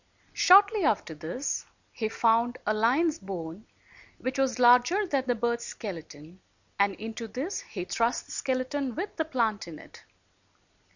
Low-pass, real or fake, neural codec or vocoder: 7.2 kHz; real; none